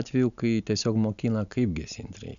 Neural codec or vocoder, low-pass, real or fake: none; 7.2 kHz; real